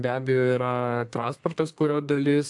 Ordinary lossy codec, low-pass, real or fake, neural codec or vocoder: AAC, 64 kbps; 10.8 kHz; fake; codec, 32 kHz, 1.9 kbps, SNAC